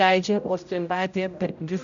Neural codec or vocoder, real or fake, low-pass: codec, 16 kHz, 0.5 kbps, X-Codec, HuBERT features, trained on general audio; fake; 7.2 kHz